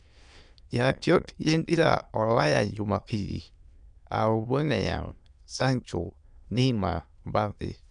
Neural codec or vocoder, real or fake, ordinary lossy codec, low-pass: autoencoder, 22.05 kHz, a latent of 192 numbers a frame, VITS, trained on many speakers; fake; none; 9.9 kHz